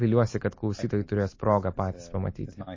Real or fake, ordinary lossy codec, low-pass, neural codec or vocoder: real; MP3, 32 kbps; 7.2 kHz; none